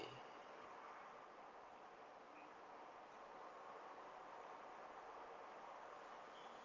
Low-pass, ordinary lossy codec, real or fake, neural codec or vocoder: 7.2 kHz; Opus, 24 kbps; real; none